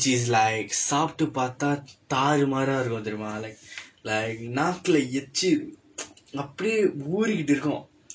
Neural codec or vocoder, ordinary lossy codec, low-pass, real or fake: none; none; none; real